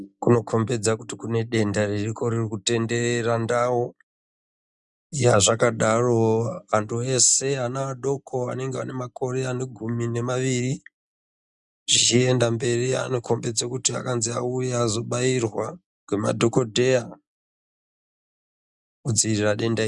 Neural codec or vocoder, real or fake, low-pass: none; real; 10.8 kHz